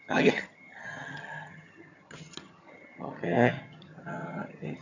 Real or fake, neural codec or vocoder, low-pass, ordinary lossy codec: fake; vocoder, 22.05 kHz, 80 mel bands, HiFi-GAN; 7.2 kHz; none